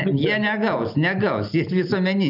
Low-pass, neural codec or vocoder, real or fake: 5.4 kHz; none; real